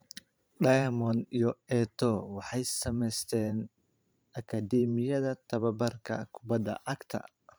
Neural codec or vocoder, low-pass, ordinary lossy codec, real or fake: vocoder, 44.1 kHz, 128 mel bands every 512 samples, BigVGAN v2; none; none; fake